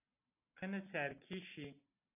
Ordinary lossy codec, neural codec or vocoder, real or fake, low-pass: AAC, 32 kbps; none; real; 3.6 kHz